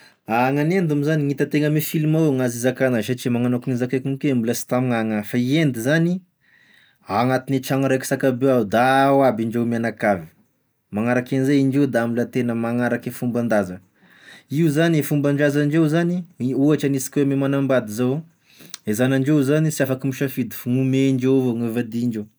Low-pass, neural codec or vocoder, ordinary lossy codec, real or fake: none; none; none; real